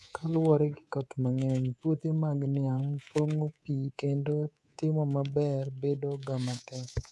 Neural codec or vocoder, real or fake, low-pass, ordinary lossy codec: codec, 24 kHz, 3.1 kbps, DualCodec; fake; none; none